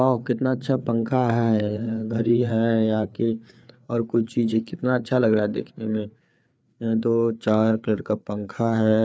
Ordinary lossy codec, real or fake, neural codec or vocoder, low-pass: none; fake; codec, 16 kHz, 4 kbps, FreqCodec, larger model; none